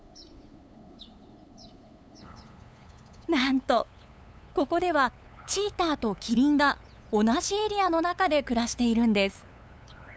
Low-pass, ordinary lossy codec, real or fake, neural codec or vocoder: none; none; fake; codec, 16 kHz, 8 kbps, FunCodec, trained on LibriTTS, 25 frames a second